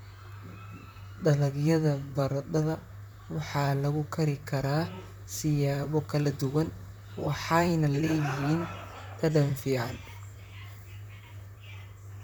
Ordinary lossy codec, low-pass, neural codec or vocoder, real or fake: none; none; vocoder, 44.1 kHz, 128 mel bands, Pupu-Vocoder; fake